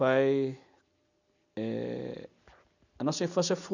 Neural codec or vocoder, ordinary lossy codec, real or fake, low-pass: none; none; real; 7.2 kHz